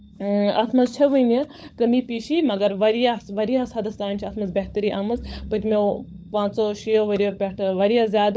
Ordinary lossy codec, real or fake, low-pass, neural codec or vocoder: none; fake; none; codec, 16 kHz, 16 kbps, FunCodec, trained on LibriTTS, 50 frames a second